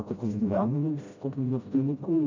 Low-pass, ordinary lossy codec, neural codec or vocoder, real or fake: 7.2 kHz; MP3, 64 kbps; codec, 16 kHz, 0.5 kbps, FreqCodec, smaller model; fake